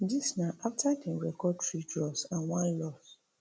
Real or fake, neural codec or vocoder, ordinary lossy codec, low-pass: real; none; none; none